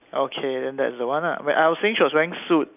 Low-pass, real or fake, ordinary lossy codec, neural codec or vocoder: 3.6 kHz; real; none; none